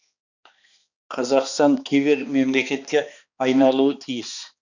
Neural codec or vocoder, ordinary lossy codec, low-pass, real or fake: codec, 16 kHz, 2 kbps, X-Codec, HuBERT features, trained on balanced general audio; none; 7.2 kHz; fake